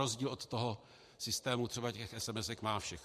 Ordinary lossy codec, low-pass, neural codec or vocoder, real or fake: MP3, 64 kbps; 14.4 kHz; vocoder, 44.1 kHz, 128 mel bands every 512 samples, BigVGAN v2; fake